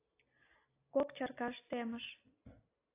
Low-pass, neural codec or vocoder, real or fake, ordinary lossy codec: 3.6 kHz; none; real; AAC, 24 kbps